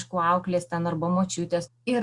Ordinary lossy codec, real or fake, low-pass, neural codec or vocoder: Opus, 64 kbps; real; 10.8 kHz; none